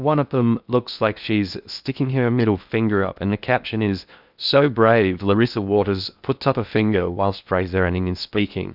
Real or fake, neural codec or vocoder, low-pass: fake; codec, 16 kHz in and 24 kHz out, 0.6 kbps, FocalCodec, streaming, 2048 codes; 5.4 kHz